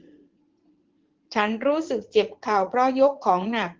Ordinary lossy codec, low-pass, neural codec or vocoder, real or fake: Opus, 16 kbps; 7.2 kHz; vocoder, 44.1 kHz, 80 mel bands, Vocos; fake